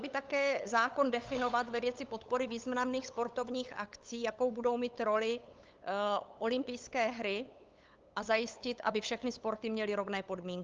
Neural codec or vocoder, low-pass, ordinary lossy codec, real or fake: codec, 16 kHz, 8 kbps, FunCodec, trained on LibriTTS, 25 frames a second; 7.2 kHz; Opus, 24 kbps; fake